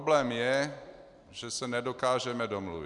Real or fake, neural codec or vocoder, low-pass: real; none; 10.8 kHz